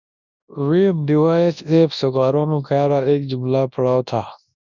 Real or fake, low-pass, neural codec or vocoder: fake; 7.2 kHz; codec, 24 kHz, 0.9 kbps, WavTokenizer, large speech release